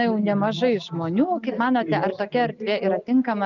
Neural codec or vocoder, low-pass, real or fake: none; 7.2 kHz; real